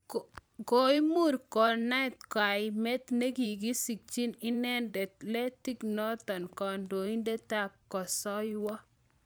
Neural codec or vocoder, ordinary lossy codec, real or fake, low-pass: vocoder, 44.1 kHz, 128 mel bands every 512 samples, BigVGAN v2; none; fake; none